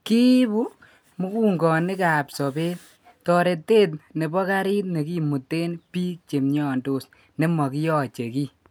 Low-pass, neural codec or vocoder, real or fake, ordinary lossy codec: none; none; real; none